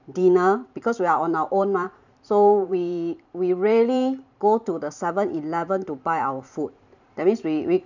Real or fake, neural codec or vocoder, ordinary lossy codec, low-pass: fake; vocoder, 44.1 kHz, 128 mel bands every 512 samples, BigVGAN v2; none; 7.2 kHz